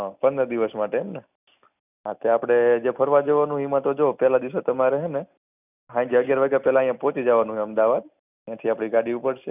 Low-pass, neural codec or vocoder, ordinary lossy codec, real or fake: 3.6 kHz; none; none; real